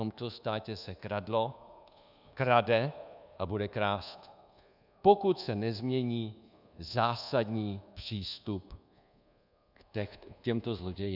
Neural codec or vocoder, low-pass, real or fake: codec, 24 kHz, 1.2 kbps, DualCodec; 5.4 kHz; fake